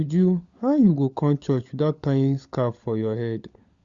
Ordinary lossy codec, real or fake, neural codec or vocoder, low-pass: Opus, 64 kbps; fake; codec, 16 kHz, 16 kbps, FunCodec, trained on LibriTTS, 50 frames a second; 7.2 kHz